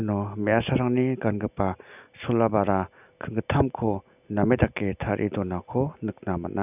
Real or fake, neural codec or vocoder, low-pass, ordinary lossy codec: real; none; 3.6 kHz; none